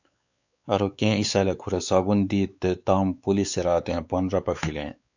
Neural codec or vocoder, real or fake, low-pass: codec, 16 kHz, 4 kbps, X-Codec, WavLM features, trained on Multilingual LibriSpeech; fake; 7.2 kHz